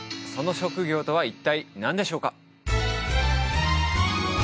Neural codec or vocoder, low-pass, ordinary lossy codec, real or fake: none; none; none; real